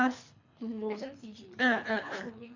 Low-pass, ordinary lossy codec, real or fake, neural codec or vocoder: 7.2 kHz; AAC, 48 kbps; fake; codec, 24 kHz, 3 kbps, HILCodec